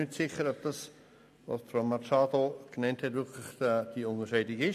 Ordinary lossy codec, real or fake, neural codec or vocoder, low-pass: MP3, 64 kbps; real; none; 14.4 kHz